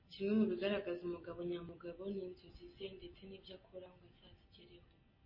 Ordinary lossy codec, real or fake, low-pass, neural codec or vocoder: MP3, 24 kbps; real; 5.4 kHz; none